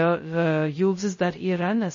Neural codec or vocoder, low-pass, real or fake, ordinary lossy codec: codec, 16 kHz, 0.2 kbps, FocalCodec; 7.2 kHz; fake; MP3, 32 kbps